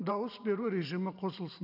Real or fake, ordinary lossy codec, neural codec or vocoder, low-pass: fake; none; vocoder, 44.1 kHz, 128 mel bands every 512 samples, BigVGAN v2; 5.4 kHz